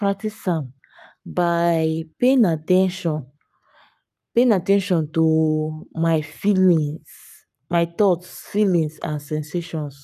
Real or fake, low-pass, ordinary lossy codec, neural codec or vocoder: fake; 14.4 kHz; none; codec, 44.1 kHz, 7.8 kbps, Pupu-Codec